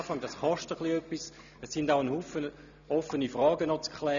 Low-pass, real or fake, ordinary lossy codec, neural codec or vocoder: 7.2 kHz; real; none; none